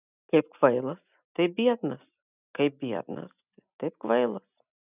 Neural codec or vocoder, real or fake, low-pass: none; real; 3.6 kHz